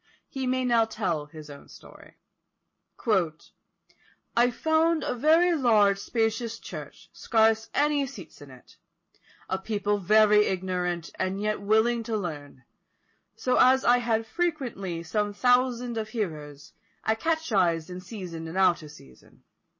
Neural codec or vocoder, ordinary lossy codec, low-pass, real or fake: none; MP3, 32 kbps; 7.2 kHz; real